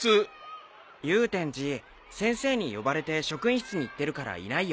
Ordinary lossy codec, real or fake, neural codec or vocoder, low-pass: none; real; none; none